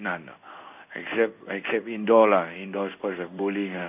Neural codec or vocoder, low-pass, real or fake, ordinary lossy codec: codec, 16 kHz in and 24 kHz out, 1 kbps, XY-Tokenizer; 3.6 kHz; fake; none